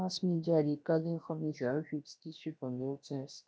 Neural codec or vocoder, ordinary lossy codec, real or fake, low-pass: codec, 16 kHz, about 1 kbps, DyCAST, with the encoder's durations; none; fake; none